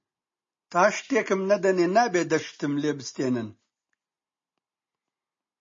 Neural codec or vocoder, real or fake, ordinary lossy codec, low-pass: none; real; MP3, 32 kbps; 7.2 kHz